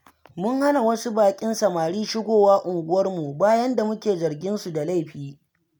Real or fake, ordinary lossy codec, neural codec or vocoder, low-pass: real; none; none; none